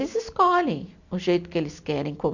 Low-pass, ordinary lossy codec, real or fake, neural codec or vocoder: 7.2 kHz; none; real; none